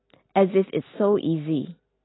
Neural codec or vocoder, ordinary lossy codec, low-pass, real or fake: none; AAC, 16 kbps; 7.2 kHz; real